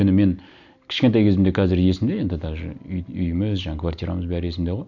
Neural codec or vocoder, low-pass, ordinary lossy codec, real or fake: none; 7.2 kHz; none; real